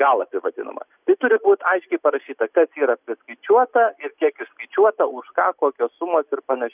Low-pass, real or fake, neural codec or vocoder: 3.6 kHz; real; none